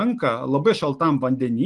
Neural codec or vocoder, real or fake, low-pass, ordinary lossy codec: none; real; 10.8 kHz; Opus, 24 kbps